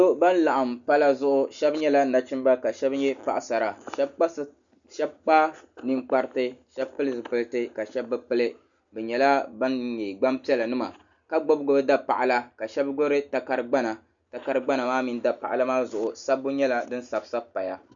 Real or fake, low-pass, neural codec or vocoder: real; 7.2 kHz; none